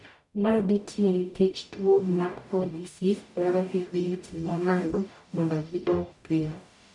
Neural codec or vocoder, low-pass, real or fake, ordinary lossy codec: codec, 44.1 kHz, 0.9 kbps, DAC; 10.8 kHz; fake; none